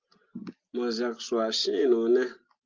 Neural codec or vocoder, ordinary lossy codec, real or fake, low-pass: none; Opus, 24 kbps; real; 7.2 kHz